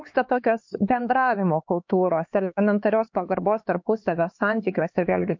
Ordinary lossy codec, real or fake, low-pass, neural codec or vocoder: MP3, 48 kbps; fake; 7.2 kHz; codec, 16 kHz, 4 kbps, X-Codec, HuBERT features, trained on LibriSpeech